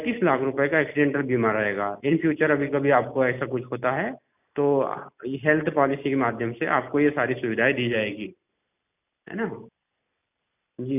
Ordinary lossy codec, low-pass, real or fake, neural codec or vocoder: none; 3.6 kHz; real; none